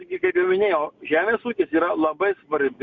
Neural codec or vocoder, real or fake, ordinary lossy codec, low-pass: none; real; Opus, 64 kbps; 7.2 kHz